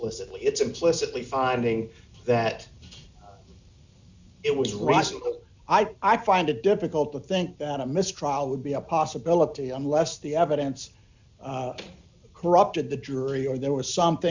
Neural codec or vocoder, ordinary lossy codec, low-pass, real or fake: none; Opus, 64 kbps; 7.2 kHz; real